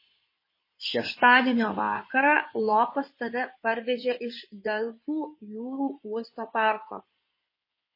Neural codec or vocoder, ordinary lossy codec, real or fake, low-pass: codec, 16 kHz in and 24 kHz out, 2.2 kbps, FireRedTTS-2 codec; MP3, 24 kbps; fake; 5.4 kHz